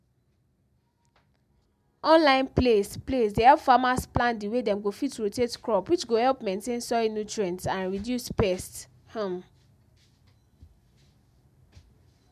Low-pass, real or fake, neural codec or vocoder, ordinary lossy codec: 14.4 kHz; real; none; MP3, 96 kbps